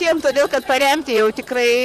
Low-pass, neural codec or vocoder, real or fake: 14.4 kHz; vocoder, 44.1 kHz, 128 mel bands, Pupu-Vocoder; fake